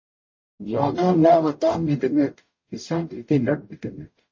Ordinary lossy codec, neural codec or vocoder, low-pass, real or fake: MP3, 32 kbps; codec, 44.1 kHz, 0.9 kbps, DAC; 7.2 kHz; fake